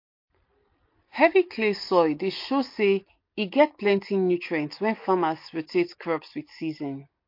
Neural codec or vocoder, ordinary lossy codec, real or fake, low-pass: none; MP3, 32 kbps; real; 5.4 kHz